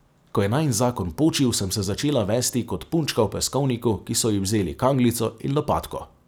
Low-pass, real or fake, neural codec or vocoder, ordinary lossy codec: none; real; none; none